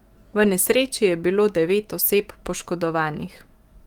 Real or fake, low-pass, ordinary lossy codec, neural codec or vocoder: fake; 19.8 kHz; Opus, 24 kbps; autoencoder, 48 kHz, 128 numbers a frame, DAC-VAE, trained on Japanese speech